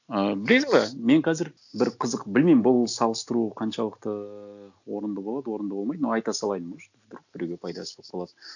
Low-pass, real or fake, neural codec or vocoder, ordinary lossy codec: 7.2 kHz; real; none; none